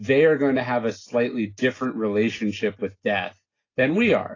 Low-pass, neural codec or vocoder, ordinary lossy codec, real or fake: 7.2 kHz; none; AAC, 32 kbps; real